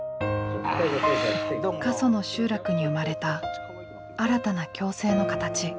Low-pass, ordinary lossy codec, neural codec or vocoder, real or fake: none; none; none; real